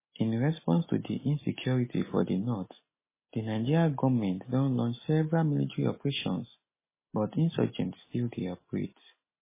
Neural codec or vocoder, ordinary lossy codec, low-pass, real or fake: none; MP3, 16 kbps; 3.6 kHz; real